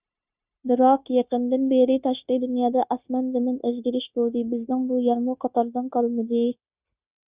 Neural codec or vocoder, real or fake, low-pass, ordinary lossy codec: codec, 16 kHz, 0.9 kbps, LongCat-Audio-Codec; fake; 3.6 kHz; Opus, 64 kbps